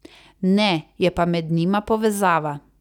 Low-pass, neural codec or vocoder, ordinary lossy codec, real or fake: 19.8 kHz; none; none; real